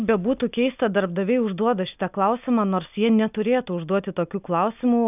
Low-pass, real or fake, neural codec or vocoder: 3.6 kHz; real; none